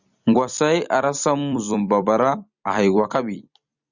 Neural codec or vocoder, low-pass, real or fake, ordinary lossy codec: vocoder, 44.1 kHz, 128 mel bands every 256 samples, BigVGAN v2; 7.2 kHz; fake; Opus, 64 kbps